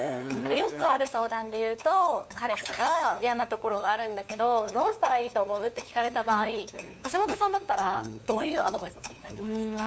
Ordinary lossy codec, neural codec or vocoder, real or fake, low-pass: none; codec, 16 kHz, 2 kbps, FunCodec, trained on LibriTTS, 25 frames a second; fake; none